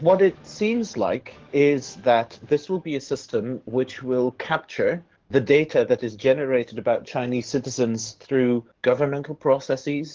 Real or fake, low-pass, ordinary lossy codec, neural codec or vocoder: fake; 7.2 kHz; Opus, 16 kbps; codec, 44.1 kHz, 7.8 kbps, DAC